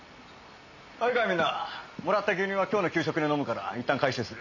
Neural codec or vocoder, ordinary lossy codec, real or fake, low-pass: none; none; real; 7.2 kHz